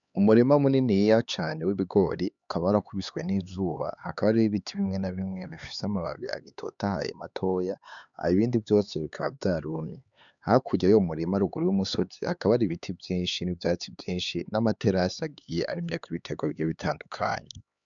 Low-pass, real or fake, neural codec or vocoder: 7.2 kHz; fake; codec, 16 kHz, 4 kbps, X-Codec, HuBERT features, trained on LibriSpeech